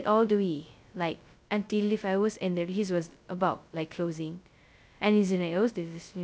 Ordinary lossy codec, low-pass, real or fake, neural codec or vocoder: none; none; fake; codec, 16 kHz, 0.2 kbps, FocalCodec